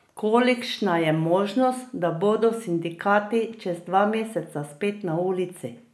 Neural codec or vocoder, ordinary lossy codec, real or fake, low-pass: none; none; real; none